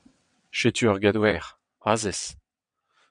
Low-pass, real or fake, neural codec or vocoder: 9.9 kHz; fake; vocoder, 22.05 kHz, 80 mel bands, WaveNeXt